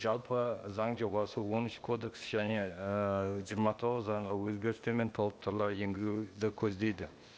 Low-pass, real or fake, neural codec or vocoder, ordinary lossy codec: none; fake; codec, 16 kHz, 0.8 kbps, ZipCodec; none